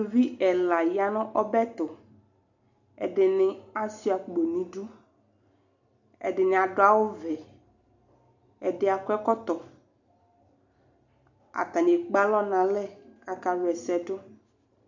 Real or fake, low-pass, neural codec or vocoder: real; 7.2 kHz; none